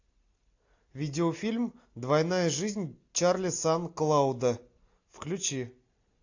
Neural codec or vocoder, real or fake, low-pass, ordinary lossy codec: none; real; 7.2 kHz; AAC, 48 kbps